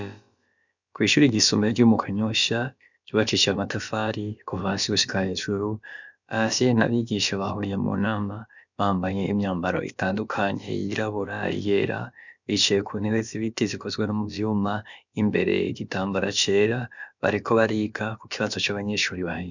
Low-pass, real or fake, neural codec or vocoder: 7.2 kHz; fake; codec, 16 kHz, about 1 kbps, DyCAST, with the encoder's durations